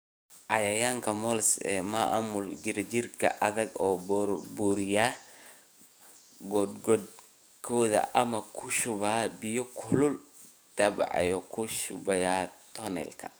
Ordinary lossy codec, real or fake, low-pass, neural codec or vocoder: none; fake; none; codec, 44.1 kHz, 7.8 kbps, DAC